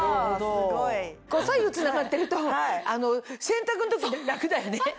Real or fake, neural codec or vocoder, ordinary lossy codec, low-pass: real; none; none; none